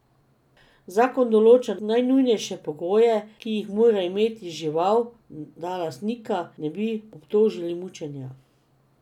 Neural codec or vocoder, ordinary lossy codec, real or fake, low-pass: none; none; real; 19.8 kHz